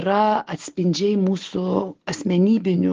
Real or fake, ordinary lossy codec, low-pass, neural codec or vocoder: real; Opus, 16 kbps; 7.2 kHz; none